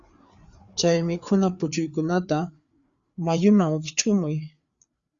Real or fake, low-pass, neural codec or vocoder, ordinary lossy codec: fake; 7.2 kHz; codec, 16 kHz, 4 kbps, FreqCodec, larger model; Opus, 64 kbps